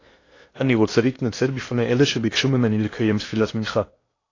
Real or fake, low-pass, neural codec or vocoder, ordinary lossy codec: fake; 7.2 kHz; codec, 16 kHz in and 24 kHz out, 0.8 kbps, FocalCodec, streaming, 65536 codes; AAC, 32 kbps